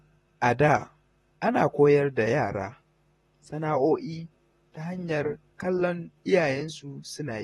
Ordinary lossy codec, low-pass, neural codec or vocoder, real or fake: AAC, 32 kbps; 19.8 kHz; vocoder, 44.1 kHz, 128 mel bands, Pupu-Vocoder; fake